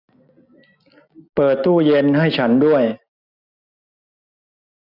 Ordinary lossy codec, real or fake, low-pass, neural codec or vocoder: none; real; 5.4 kHz; none